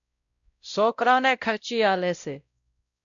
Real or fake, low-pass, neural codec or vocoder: fake; 7.2 kHz; codec, 16 kHz, 0.5 kbps, X-Codec, WavLM features, trained on Multilingual LibriSpeech